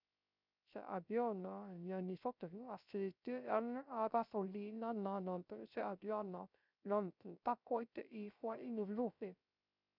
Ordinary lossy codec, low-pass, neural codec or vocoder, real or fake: none; 5.4 kHz; codec, 16 kHz, 0.3 kbps, FocalCodec; fake